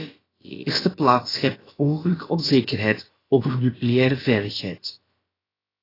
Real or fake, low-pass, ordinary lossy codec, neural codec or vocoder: fake; 5.4 kHz; AAC, 24 kbps; codec, 16 kHz, about 1 kbps, DyCAST, with the encoder's durations